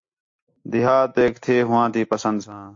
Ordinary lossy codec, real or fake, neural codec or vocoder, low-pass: AAC, 48 kbps; real; none; 7.2 kHz